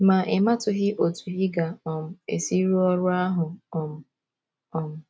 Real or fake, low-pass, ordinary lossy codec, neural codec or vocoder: real; none; none; none